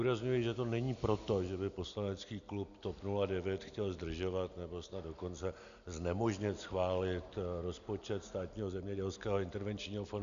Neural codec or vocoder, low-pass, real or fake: none; 7.2 kHz; real